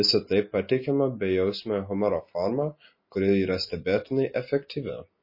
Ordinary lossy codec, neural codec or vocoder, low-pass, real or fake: MP3, 24 kbps; none; 5.4 kHz; real